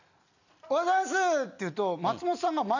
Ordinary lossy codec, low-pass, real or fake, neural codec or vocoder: none; 7.2 kHz; real; none